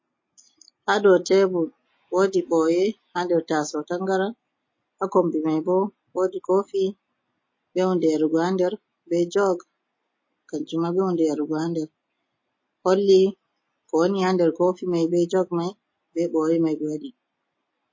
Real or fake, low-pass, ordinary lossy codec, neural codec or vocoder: real; 7.2 kHz; MP3, 32 kbps; none